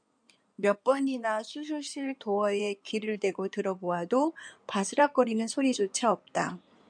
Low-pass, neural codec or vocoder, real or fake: 9.9 kHz; codec, 16 kHz in and 24 kHz out, 2.2 kbps, FireRedTTS-2 codec; fake